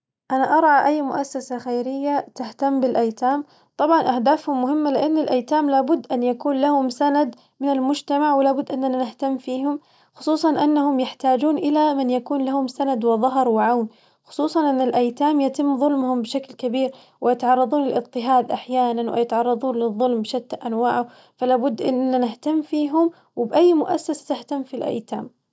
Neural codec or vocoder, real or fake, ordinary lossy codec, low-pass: none; real; none; none